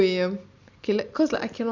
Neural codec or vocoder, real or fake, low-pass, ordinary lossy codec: none; real; 7.2 kHz; Opus, 64 kbps